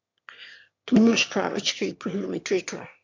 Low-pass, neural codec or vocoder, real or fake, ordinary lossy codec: 7.2 kHz; autoencoder, 22.05 kHz, a latent of 192 numbers a frame, VITS, trained on one speaker; fake; MP3, 64 kbps